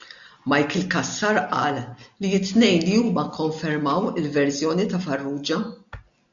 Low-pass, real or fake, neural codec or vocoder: 7.2 kHz; real; none